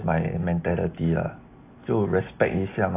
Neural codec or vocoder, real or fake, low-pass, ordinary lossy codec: none; real; 3.6 kHz; AAC, 24 kbps